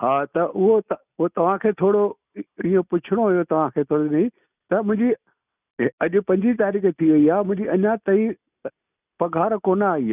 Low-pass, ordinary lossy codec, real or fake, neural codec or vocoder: 3.6 kHz; none; real; none